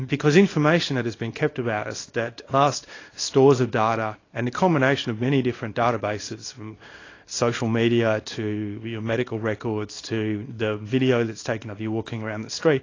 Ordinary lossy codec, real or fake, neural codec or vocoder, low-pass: AAC, 32 kbps; fake; codec, 24 kHz, 0.9 kbps, WavTokenizer, small release; 7.2 kHz